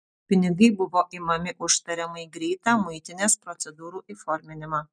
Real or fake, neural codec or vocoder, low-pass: real; none; 9.9 kHz